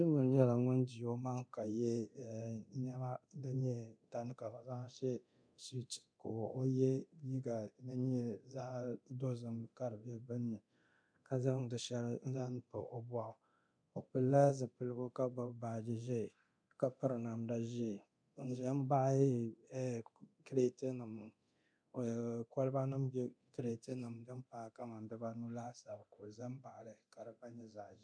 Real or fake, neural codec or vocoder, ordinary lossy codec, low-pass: fake; codec, 24 kHz, 0.9 kbps, DualCodec; MP3, 96 kbps; 9.9 kHz